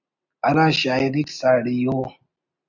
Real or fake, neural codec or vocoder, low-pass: real; none; 7.2 kHz